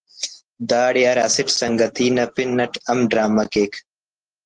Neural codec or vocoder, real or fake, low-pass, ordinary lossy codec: none; real; 9.9 kHz; Opus, 16 kbps